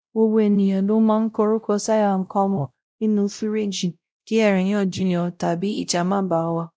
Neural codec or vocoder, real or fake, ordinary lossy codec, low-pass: codec, 16 kHz, 0.5 kbps, X-Codec, WavLM features, trained on Multilingual LibriSpeech; fake; none; none